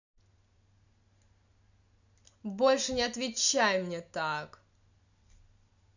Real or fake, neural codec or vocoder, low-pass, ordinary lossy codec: real; none; 7.2 kHz; none